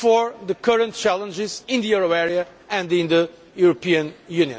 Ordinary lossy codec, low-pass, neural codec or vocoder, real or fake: none; none; none; real